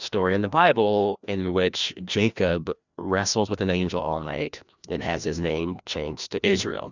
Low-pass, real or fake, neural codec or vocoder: 7.2 kHz; fake; codec, 16 kHz, 1 kbps, FreqCodec, larger model